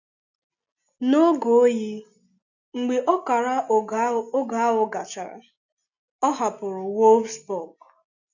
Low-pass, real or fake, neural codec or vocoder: 7.2 kHz; real; none